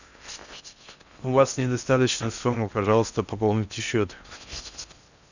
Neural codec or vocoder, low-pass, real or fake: codec, 16 kHz in and 24 kHz out, 0.6 kbps, FocalCodec, streaming, 4096 codes; 7.2 kHz; fake